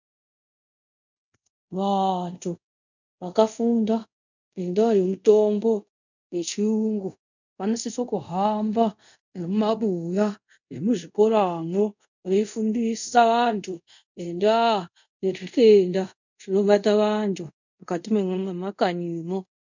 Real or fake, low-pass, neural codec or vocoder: fake; 7.2 kHz; codec, 24 kHz, 0.5 kbps, DualCodec